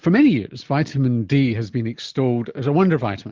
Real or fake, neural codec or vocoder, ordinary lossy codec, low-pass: real; none; Opus, 24 kbps; 7.2 kHz